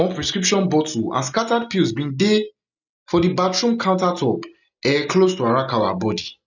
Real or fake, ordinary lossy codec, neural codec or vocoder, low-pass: real; none; none; 7.2 kHz